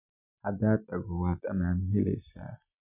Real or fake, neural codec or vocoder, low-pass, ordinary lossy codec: real; none; 3.6 kHz; none